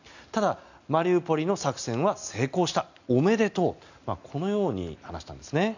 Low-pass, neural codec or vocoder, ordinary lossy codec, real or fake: 7.2 kHz; none; none; real